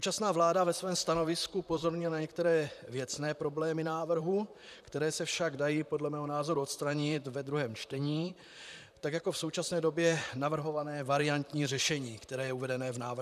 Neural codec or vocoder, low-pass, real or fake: vocoder, 44.1 kHz, 128 mel bands, Pupu-Vocoder; 14.4 kHz; fake